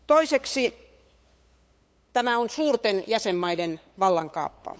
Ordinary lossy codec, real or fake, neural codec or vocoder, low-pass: none; fake; codec, 16 kHz, 8 kbps, FunCodec, trained on LibriTTS, 25 frames a second; none